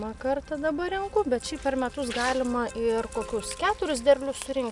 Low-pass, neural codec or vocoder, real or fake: 10.8 kHz; none; real